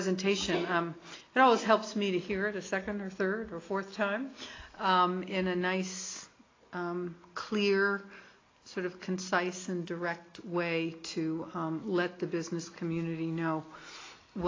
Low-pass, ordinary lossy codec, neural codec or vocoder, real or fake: 7.2 kHz; AAC, 32 kbps; none; real